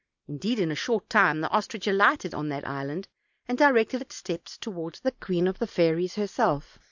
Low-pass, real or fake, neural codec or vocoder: 7.2 kHz; real; none